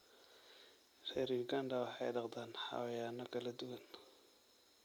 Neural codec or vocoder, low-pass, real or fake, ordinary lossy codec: none; none; real; none